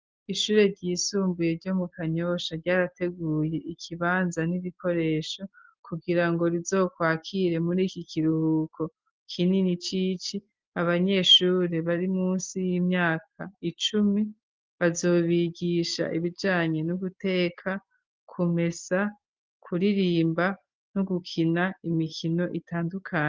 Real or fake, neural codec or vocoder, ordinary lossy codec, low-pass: real; none; Opus, 32 kbps; 7.2 kHz